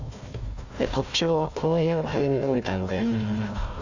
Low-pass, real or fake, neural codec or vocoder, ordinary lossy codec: 7.2 kHz; fake; codec, 16 kHz, 1 kbps, FunCodec, trained on Chinese and English, 50 frames a second; none